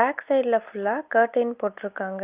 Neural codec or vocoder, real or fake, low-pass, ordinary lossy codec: none; real; 3.6 kHz; Opus, 32 kbps